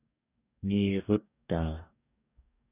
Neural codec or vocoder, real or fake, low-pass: codec, 44.1 kHz, 2.6 kbps, DAC; fake; 3.6 kHz